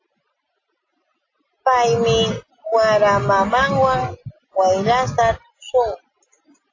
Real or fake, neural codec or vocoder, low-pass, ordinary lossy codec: real; none; 7.2 kHz; AAC, 32 kbps